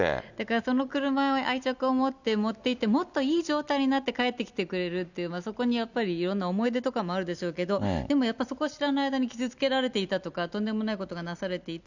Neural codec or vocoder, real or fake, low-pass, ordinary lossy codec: none; real; 7.2 kHz; none